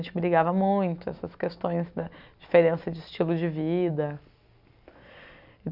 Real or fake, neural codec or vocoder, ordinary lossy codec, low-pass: real; none; none; 5.4 kHz